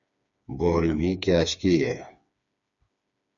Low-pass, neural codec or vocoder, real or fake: 7.2 kHz; codec, 16 kHz, 4 kbps, FreqCodec, smaller model; fake